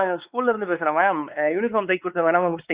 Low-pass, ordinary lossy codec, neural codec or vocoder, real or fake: 3.6 kHz; Opus, 64 kbps; codec, 16 kHz, 4 kbps, X-Codec, WavLM features, trained on Multilingual LibriSpeech; fake